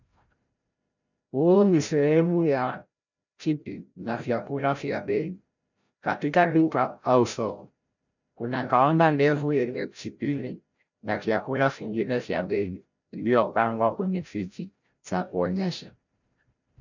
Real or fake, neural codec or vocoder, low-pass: fake; codec, 16 kHz, 0.5 kbps, FreqCodec, larger model; 7.2 kHz